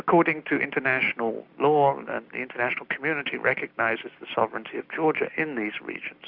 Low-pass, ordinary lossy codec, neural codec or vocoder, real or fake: 5.4 kHz; Opus, 64 kbps; none; real